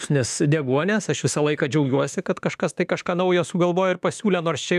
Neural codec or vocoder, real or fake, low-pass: autoencoder, 48 kHz, 32 numbers a frame, DAC-VAE, trained on Japanese speech; fake; 14.4 kHz